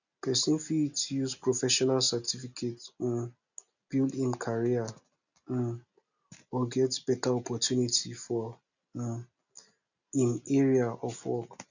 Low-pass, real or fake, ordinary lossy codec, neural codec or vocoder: 7.2 kHz; real; none; none